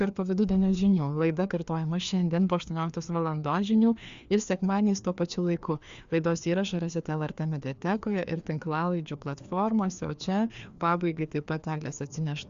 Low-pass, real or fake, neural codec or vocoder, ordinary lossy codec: 7.2 kHz; fake; codec, 16 kHz, 2 kbps, FreqCodec, larger model; Opus, 64 kbps